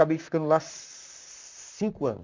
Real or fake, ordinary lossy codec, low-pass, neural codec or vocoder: fake; MP3, 64 kbps; 7.2 kHz; vocoder, 44.1 kHz, 128 mel bands, Pupu-Vocoder